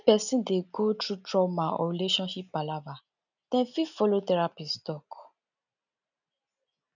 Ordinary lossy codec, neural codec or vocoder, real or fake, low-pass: none; none; real; 7.2 kHz